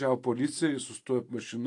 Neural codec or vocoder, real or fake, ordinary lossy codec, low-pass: none; real; AAC, 48 kbps; 10.8 kHz